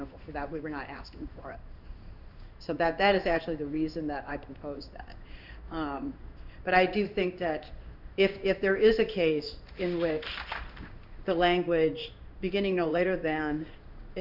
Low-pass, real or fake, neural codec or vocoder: 5.4 kHz; fake; codec, 16 kHz in and 24 kHz out, 1 kbps, XY-Tokenizer